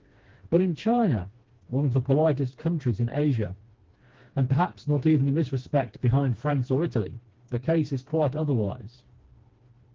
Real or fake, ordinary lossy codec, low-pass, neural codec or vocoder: fake; Opus, 16 kbps; 7.2 kHz; codec, 16 kHz, 2 kbps, FreqCodec, smaller model